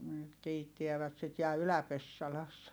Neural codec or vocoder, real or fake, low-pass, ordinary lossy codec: none; real; none; none